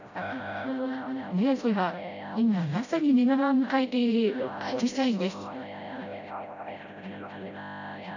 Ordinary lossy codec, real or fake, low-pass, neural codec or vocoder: none; fake; 7.2 kHz; codec, 16 kHz, 0.5 kbps, FreqCodec, smaller model